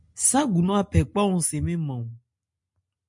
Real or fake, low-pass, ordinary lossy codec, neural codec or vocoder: real; 10.8 kHz; MP3, 64 kbps; none